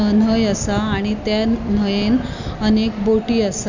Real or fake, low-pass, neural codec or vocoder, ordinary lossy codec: real; 7.2 kHz; none; none